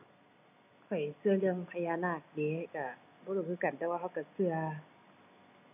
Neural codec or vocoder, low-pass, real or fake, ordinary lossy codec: none; 3.6 kHz; real; none